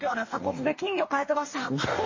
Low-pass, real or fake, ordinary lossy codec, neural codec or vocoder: 7.2 kHz; fake; MP3, 32 kbps; codec, 44.1 kHz, 2.6 kbps, DAC